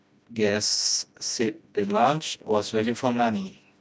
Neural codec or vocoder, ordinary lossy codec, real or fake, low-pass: codec, 16 kHz, 1 kbps, FreqCodec, smaller model; none; fake; none